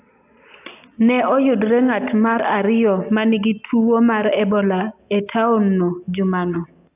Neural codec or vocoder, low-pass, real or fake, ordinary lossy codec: none; 3.6 kHz; real; AAC, 32 kbps